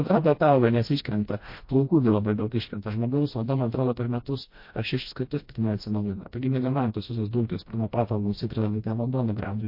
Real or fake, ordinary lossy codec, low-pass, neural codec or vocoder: fake; MP3, 32 kbps; 5.4 kHz; codec, 16 kHz, 1 kbps, FreqCodec, smaller model